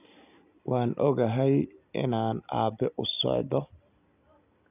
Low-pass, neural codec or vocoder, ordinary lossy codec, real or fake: 3.6 kHz; none; none; real